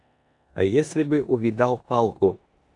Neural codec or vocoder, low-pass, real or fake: codec, 16 kHz in and 24 kHz out, 0.9 kbps, LongCat-Audio-Codec, four codebook decoder; 10.8 kHz; fake